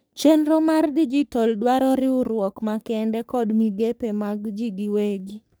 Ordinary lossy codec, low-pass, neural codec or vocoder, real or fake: none; none; codec, 44.1 kHz, 3.4 kbps, Pupu-Codec; fake